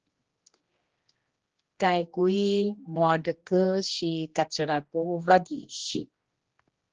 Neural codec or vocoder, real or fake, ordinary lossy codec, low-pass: codec, 16 kHz, 1 kbps, X-Codec, HuBERT features, trained on general audio; fake; Opus, 16 kbps; 7.2 kHz